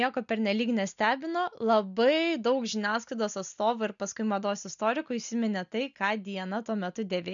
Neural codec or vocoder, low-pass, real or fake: none; 7.2 kHz; real